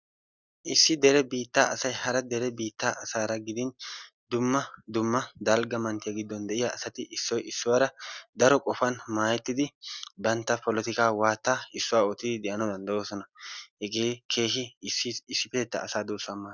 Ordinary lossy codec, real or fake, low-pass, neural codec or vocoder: Opus, 64 kbps; real; 7.2 kHz; none